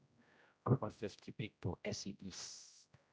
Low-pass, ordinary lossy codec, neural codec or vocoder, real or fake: none; none; codec, 16 kHz, 0.5 kbps, X-Codec, HuBERT features, trained on general audio; fake